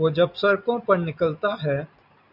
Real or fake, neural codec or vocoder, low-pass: real; none; 5.4 kHz